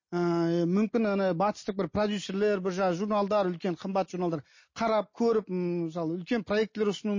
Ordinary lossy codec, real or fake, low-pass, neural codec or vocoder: MP3, 32 kbps; real; 7.2 kHz; none